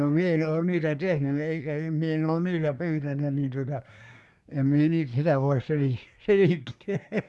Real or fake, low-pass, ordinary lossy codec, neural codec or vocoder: fake; none; none; codec, 24 kHz, 1 kbps, SNAC